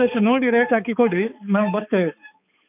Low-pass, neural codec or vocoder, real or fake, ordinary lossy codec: 3.6 kHz; codec, 16 kHz, 4 kbps, X-Codec, HuBERT features, trained on balanced general audio; fake; none